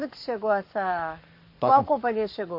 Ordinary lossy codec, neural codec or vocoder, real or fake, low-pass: MP3, 32 kbps; codec, 44.1 kHz, 7.8 kbps, Pupu-Codec; fake; 5.4 kHz